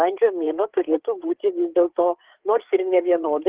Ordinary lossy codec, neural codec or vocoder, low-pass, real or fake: Opus, 16 kbps; codec, 16 kHz, 8 kbps, FreqCodec, larger model; 3.6 kHz; fake